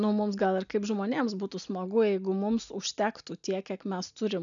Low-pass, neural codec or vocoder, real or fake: 7.2 kHz; none; real